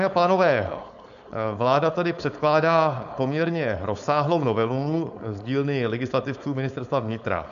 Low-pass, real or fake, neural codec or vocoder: 7.2 kHz; fake; codec, 16 kHz, 4.8 kbps, FACodec